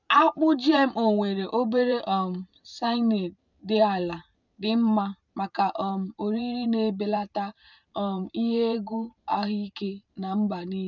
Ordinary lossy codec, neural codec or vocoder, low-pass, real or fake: none; vocoder, 44.1 kHz, 128 mel bands every 512 samples, BigVGAN v2; 7.2 kHz; fake